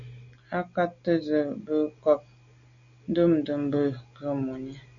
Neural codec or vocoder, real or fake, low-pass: none; real; 7.2 kHz